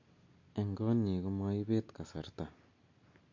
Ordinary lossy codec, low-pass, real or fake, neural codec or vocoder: MP3, 48 kbps; 7.2 kHz; real; none